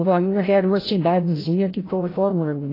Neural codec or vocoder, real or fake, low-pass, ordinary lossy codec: codec, 16 kHz, 0.5 kbps, FreqCodec, larger model; fake; 5.4 kHz; AAC, 24 kbps